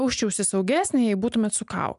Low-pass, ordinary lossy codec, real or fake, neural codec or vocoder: 10.8 kHz; MP3, 96 kbps; real; none